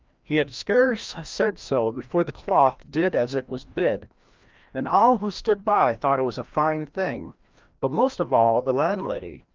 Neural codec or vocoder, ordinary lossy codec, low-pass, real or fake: codec, 16 kHz, 1 kbps, FreqCodec, larger model; Opus, 24 kbps; 7.2 kHz; fake